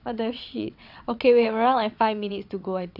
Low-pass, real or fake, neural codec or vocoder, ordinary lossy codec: 5.4 kHz; fake; vocoder, 22.05 kHz, 80 mel bands, WaveNeXt; none